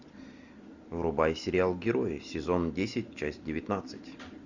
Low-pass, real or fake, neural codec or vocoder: 7.2 kHz; real; none